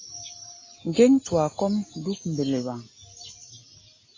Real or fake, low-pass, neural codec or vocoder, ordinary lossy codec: real; 7.2 kHz; none; AAC, 32 kbps